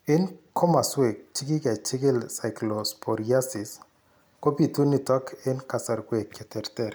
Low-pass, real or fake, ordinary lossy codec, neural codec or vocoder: none; real; none; none